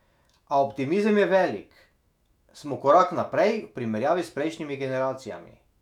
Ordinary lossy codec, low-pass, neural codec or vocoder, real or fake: none; 19.8 kHz; autoencoder, 48 kHz, 128 numbers a frame, DAC-VAE, trained on Japanese speech; fake